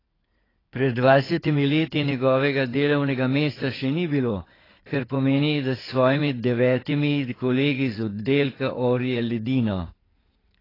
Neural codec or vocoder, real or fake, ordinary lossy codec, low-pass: codec, 16 kHz in and 24 kHz out, 2.2 kbps, FireRedTTS-2 codec; fake; AAC, 24 kbps; 5.4 kHz